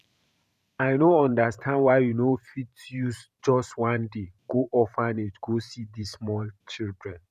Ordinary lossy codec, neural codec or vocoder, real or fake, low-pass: none; vocoder, 44.1 kHz, 128 mel bands every 512 samples, BigVGAN v2; fake; 14.4 kHz